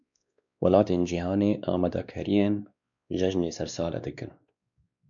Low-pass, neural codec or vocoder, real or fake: 7.2 kHz; codec, 16 kHz, 2 kbps, X-Codec, WavLM features, trained on Multilingual LibriSpeech; fake